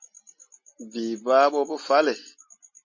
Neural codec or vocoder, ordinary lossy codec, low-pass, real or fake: none; MP3, 32 kbps; 7.2 kHz; real